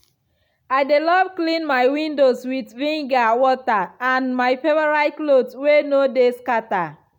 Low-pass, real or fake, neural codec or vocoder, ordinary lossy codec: 19.8 kHz; real; none; none